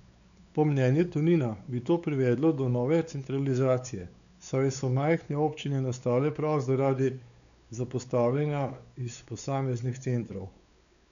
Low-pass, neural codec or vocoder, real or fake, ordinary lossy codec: 7.2 kHz; codec, 16 kHz, 4 kbps, X-Codec, WavLM features, trained on Multilingual LibriSpeech; fake; none